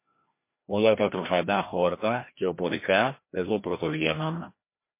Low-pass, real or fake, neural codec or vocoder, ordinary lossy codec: 3.6 kHz; fake; codec, 16 kHz, 1 kbps, FreqCodec, larger model; MP3, 24 kbps